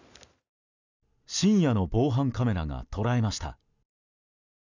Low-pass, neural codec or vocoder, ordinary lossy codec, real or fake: 7.2 kHz; none; none; real